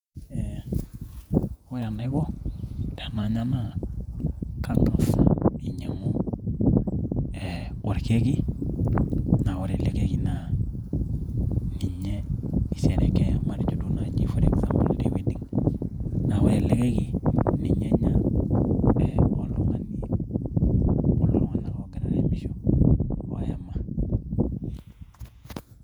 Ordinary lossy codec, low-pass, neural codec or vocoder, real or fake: none; 19.8 kHz; vocoder, 48 kHz, 128 mel bands, Vocos; fake